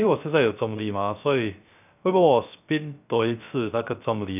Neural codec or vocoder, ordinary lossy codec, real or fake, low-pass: codec, 16 kHz, 0.3 kbps, FocalCodec; none; fake; 3.6 kHz